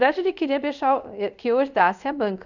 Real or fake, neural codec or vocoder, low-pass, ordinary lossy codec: fake; codec, 24 kHz, 0.5 kbps, DualCodec; 7.2 kHz; none